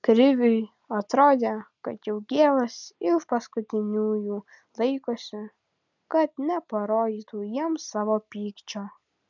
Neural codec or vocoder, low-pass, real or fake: none; 7.2 kHz; real